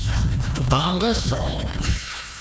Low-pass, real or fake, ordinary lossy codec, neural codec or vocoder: none; fake; none; codec, 16 kHz, 1 kbps, FunCodec, trained on Chinese and English, 50 frames a second